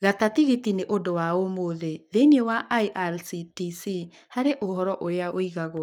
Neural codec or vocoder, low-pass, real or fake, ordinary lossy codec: codec, 44.1 kHz, 7.8 kbps, Pupu-Codec; 19.8 kHz; fake; none